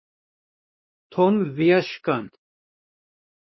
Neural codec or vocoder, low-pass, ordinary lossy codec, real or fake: codec, 16 kHz, 2 kbps, X-Codec, WavLM features, trained on Multilingual LibriSpeech; 7.2 kHz; MP3, 24 kbps; fake